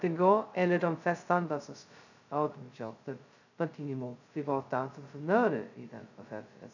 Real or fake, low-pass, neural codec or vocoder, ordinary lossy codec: fake; 7.2 kHz; codec, 16 kHz, 0.2 kbps, FocalCodec; none